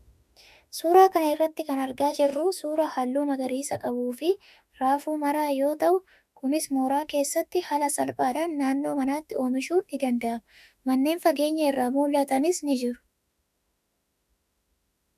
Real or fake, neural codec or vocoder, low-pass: fake; autoencoder, 48 kHz, 32 numbers a frame, DAC-VAE, trained on Japanese speech; 14.4 kHz